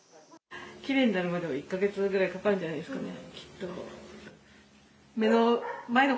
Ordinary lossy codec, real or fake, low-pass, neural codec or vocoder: none; real; none; none